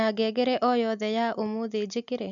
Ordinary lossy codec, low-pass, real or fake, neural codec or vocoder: none; 7.2 kHz; real; none